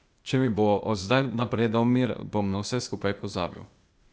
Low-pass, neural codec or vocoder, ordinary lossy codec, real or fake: none; codec, 16 kHz, 0.8 kbps, ZipCodec; none; fake